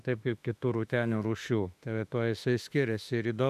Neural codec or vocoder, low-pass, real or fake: autoencoder, 48 kHz, 32 numbers a frame, DAC-VAE, trained on Japanese speech; 14.4 kHz; fake